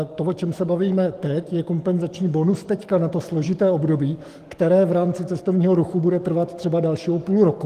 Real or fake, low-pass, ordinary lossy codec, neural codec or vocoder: fake; 14.4 kHz; Opus, 24 kbps; codec, 44.1 kHz, 7.8 kbps, DAC